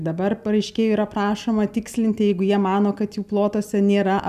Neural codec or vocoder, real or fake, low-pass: none; real; 14.4 kHz